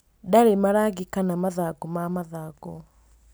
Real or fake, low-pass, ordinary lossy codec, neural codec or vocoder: real; none; none; none